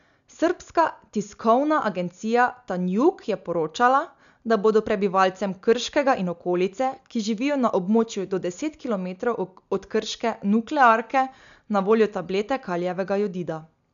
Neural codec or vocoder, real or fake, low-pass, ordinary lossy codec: none; real; 7.2 kHz; none